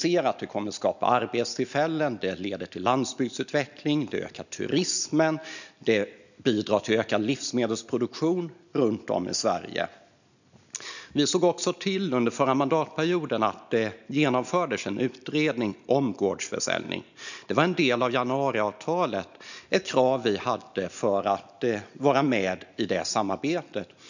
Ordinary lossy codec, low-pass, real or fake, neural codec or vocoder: none; 7.2 kHz; real; none